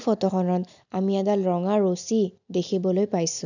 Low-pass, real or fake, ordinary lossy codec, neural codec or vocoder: 7.2 kHz; real; none; none